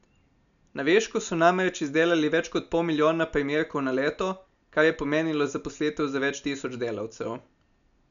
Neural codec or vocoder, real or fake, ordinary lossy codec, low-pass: none; real; none; 7.2 kHz